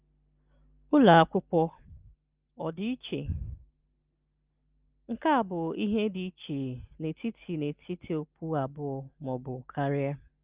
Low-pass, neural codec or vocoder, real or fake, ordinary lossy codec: 3.6 kHz; none; real; Opus, 24 kbps